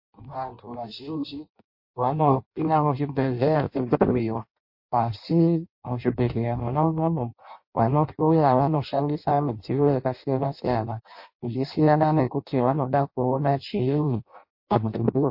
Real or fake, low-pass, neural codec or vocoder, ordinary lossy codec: fake; 5.4 kHz; codec, 16 kHz in and 24 kHz out, 0.6 kbps, FireRedTTS-2 codec; MP3, 32 kbps